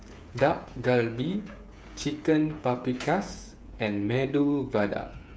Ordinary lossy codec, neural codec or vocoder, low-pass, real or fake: none; codec, 16 kHz, 8 kbps, FreqCodec, smaller model; none; fake